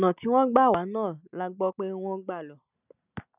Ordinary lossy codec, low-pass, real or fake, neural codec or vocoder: none; 3.6 kHz; real; none